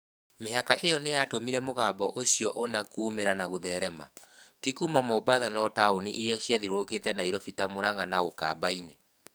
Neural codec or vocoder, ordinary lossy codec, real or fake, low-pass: codec, 44.1 kHz, 2.6 kbps, SNAC; none; fake; none